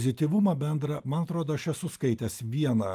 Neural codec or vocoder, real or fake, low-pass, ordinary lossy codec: none; real; 14.4 kHz; Opus, 32 kbps